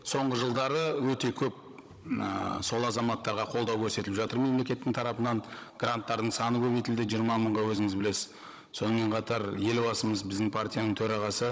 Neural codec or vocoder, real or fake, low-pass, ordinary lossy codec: codec, 16 kHz, 16 kbps, FunCodec, trained on Chinese and English, 50 frames a second; fake; none; none